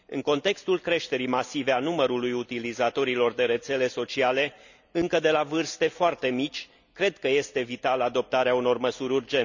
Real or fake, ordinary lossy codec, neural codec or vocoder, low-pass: real; none; none; 7.2 kHz